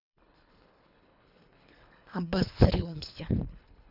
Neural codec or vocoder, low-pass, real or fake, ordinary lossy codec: codec, 24 kHz, 3 kbps, HILCodec; 5.4 kHz; fake; none